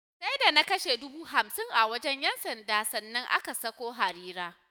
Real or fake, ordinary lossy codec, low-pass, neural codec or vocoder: fake; none; none; autoencoder, 48 kHz, 128 numbers a frame, DAC-VAE, trained on Japanese speech